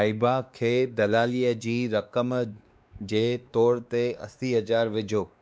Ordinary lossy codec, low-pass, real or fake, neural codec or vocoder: none; none; fake; codec, 16 kHz, 2 kbps, X-Codec, WavLM features, trained on Multilingual LibriSpeech